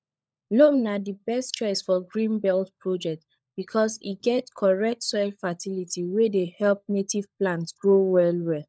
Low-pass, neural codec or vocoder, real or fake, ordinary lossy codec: none; codec, 16 kHz, 16 kbps, FunCodec, trained on LibriTTS, 50 frames a second; fake; none